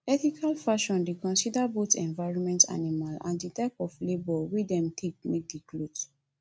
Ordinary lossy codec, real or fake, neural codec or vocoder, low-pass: none; real; none; none